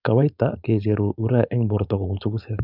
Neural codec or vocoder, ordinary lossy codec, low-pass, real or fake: codec, 16 kHz, 4.8 kbps, FACodec; none; 5.4 kHz; fake